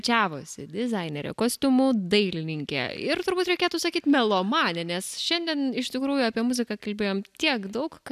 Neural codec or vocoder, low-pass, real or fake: none; 14.4 kHz; real